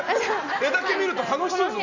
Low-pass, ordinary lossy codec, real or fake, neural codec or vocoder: 7.2 kHz; none; real; none